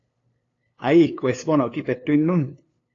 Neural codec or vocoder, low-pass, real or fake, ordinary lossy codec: codec, 16 kHz, 2 kbps, FunCodec, trained on LibriTTS, 25 frames a second; 7.2 kHz; fake; AAC, 32 kbps